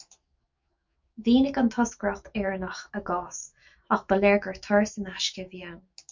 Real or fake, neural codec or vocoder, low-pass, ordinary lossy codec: fake; autoencoder, 48 kHz, 128 numbers a frame, DAC-VAE, trained on Japanese speech; 7.2 kHz; MP3, 64 kbps